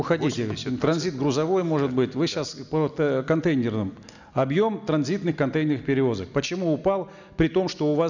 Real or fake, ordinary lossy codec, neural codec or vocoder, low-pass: real; none; none; 7.2 kHz